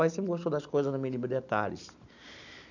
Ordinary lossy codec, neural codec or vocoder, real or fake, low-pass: none; none; real; 7.2 kHz